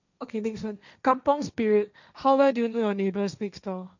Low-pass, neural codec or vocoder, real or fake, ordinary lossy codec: 7.2 kHz; codec, 16 kHz, 1.1 kbps, Voila-Tokenizer; fake; none